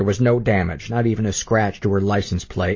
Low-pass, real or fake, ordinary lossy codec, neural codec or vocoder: 7.2 kHz; real; MP3, 32 kbps; none